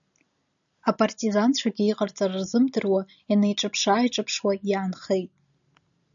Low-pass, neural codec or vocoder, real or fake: 7.2 kHz; none; real